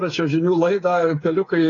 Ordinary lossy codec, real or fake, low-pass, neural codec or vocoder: AAC, 32 kbps; fake; 7.2 kHz; codec, 16 kHz, 8 kbps, FreqCodec, smaller model